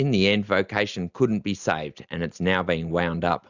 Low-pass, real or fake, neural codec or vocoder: 7.2 kHz; real; none